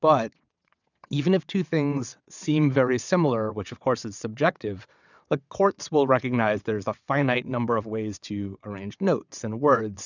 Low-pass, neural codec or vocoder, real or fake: 7.2 kHz; vocoder, 22.05 kHz, 80 mel bands, WaveNeXt; fake